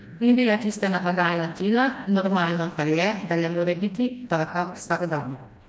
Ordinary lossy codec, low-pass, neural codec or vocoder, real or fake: none; none; codec, 16 kHz, 1 kbps, FreqCodec, smaller model; fake